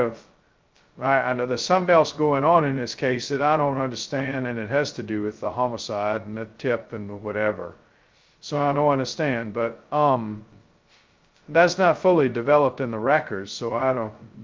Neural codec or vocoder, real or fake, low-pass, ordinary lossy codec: codec, 16 kHz, 0.2 kbps, FocalCodec; fake; 7.2 kHz; Opus, 24 kbps